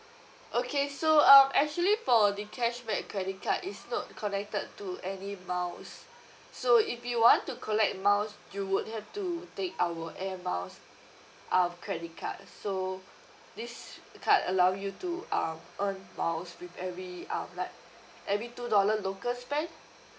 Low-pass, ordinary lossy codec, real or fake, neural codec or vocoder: none; none; real; none